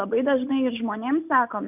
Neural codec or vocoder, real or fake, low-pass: none; real; 3.6 kHz